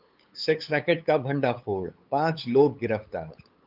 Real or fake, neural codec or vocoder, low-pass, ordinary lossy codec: fake; codec, 16 kHz, 8 kbps, FunCodec, trained on LibriTTS, 25 frames a second; 5.4 kHz; Opus, 24 kbps